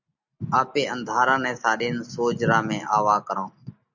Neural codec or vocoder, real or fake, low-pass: none; real; 7.2 kHz